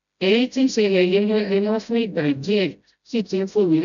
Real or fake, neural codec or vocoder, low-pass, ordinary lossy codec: fake; codec, 16 kHz, 0.5 kbps, FreqCodec, smaller model; 7.2 kHz; none